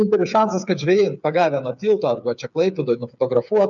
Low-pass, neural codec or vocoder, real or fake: 7.2 kHz; codec, 16 kHz, 8 kbps, FreqCodec, smaller model; fake